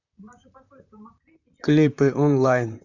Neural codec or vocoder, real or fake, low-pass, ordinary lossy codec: vocoder, 44.1 kHz, 128 mel bands, Pupu-Vocoder; fake; 7.2 kHz; none